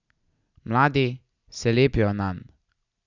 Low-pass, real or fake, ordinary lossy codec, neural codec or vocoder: 7.2 kHz; real; none; none